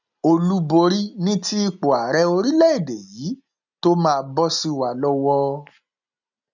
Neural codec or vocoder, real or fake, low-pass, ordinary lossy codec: none; real; 7.2 kHz; none